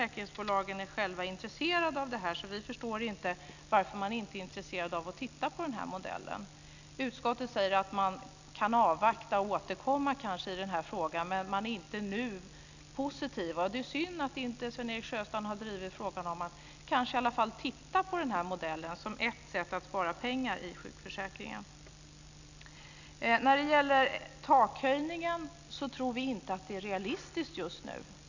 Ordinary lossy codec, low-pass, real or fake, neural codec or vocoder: none; 7.2 kHz; real; none